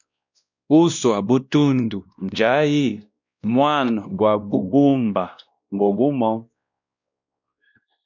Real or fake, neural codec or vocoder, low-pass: fake; codec, 16 kHz, 1 kbps, X-Codec, WavLM features, trained on Multilingual LibriSpeech; 7.2 kHz